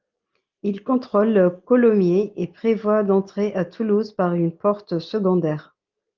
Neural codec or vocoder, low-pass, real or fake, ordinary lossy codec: none; 7.2 kHz; real; Opus, 24 kbps